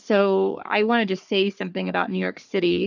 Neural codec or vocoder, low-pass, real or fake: codec, 44.1 kHz, 3.4 kbps, Pupu-Codec; 7.2 kHz; fake